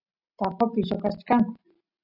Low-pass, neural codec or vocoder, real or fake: 5.4 kHz; none; real